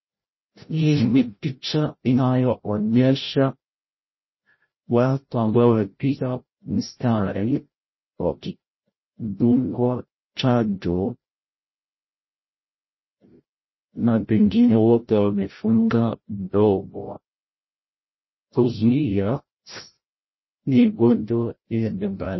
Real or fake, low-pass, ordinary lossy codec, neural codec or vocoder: fake; 7.2 kHz; MP3, 24 kbps; codec, 16 kHz, 0.5 kbps, FreqCodec, larger model